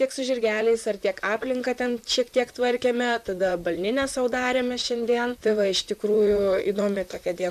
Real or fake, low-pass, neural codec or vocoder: fake; 14.4 kHz; vocoder, 44.1 kHz, 128 mel bands, Pupu-Vocoder